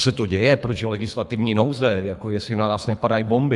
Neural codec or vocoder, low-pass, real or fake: codec, 24 kHz, 3 kbps, HILCodec; 10.8 kHz; fake